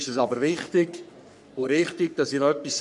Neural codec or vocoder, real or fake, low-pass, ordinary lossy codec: codec, 44.1 kHz, 3.4 kbps, Pupu-Codec; fake; 10.8 kHz; none